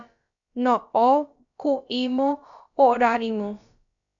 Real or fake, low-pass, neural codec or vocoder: fake; 7.2 kHz; codec, 16 kHz, about 1 kbps, DyCAST, with the encoder's durations